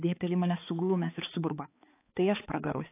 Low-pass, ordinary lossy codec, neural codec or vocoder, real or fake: 3.6 kHz; AAC, 24 kbps; codec, 16 kHz, 4 kbps, X-Codec, HuBERT features, trained on balanced general audio; fake